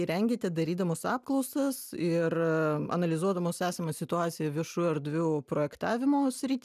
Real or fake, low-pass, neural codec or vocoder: real; 14.4 kHz; none